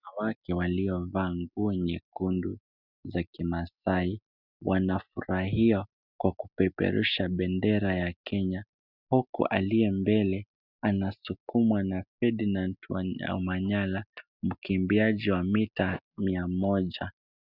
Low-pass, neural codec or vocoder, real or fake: 5.4 kHz; none; real